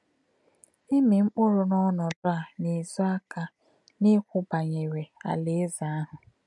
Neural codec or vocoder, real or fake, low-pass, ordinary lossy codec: none; real; 10.8 kHz; MP3, 64 kbps